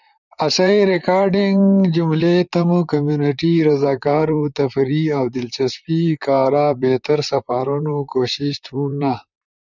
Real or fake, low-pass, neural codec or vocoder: fake; 7.2 kHz; vocoder, 44.1 kHz, 128 mel bands, Pupu-Vocoder